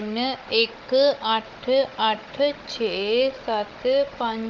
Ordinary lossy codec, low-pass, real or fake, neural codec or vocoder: Opus, 24 kbps; 7.2 kHz; fake; codec, 16 kHz, 16 kbps, FunCodec, trained on Chinese and English, 50 frames a second